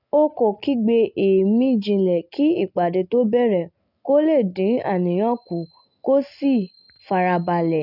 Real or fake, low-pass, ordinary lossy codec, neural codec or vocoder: real; 5.4 kHz; none; none